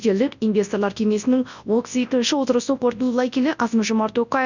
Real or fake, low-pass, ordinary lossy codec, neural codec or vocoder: fake; 7.2 kHz; none; codec, 24 kHz, 0.9 kbps, WavTokenizer, large speech release